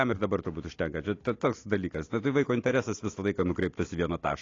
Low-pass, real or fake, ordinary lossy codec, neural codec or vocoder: 7.2 kHz; real; AAC, 32 kbps; none